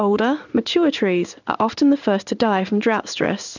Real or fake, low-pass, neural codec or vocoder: fake; 7.2 kHz; codec, 16 kHz in and 24 kHz out, 1 kbps, XY-Tokenizer